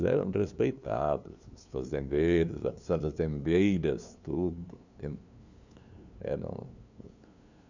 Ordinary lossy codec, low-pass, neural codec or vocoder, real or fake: none; 7.2 kHz; codec, 16 kHz, 2 kbps, FunCodec, trained on LibriTTS, 25 frames a second; fake